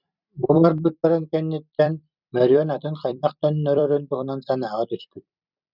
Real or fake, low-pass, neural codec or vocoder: fake; 5.4 kHz; vocoder, 44.1 kHz, 128 mel bands every 256 samples, BigVGAN v2